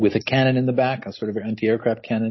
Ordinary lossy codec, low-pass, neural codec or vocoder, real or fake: MP3, 24 kbps; 7.2 kHz; none; real